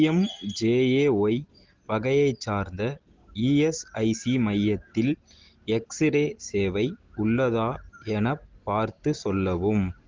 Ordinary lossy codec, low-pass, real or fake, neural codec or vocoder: Opus, 16 kbps; 7.2 kHz; real; none